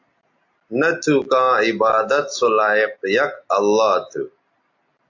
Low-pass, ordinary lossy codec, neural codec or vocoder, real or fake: 7.2 kHz; AAC, 48 kbps; none; real